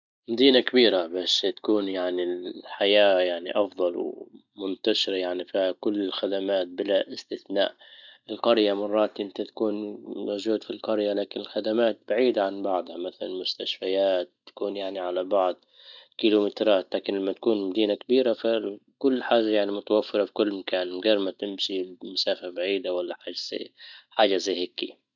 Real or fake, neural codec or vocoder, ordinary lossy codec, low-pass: real; none; none; 7.2 kHz